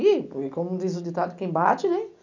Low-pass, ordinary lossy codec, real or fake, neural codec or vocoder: 7.2 kHz; none; real; none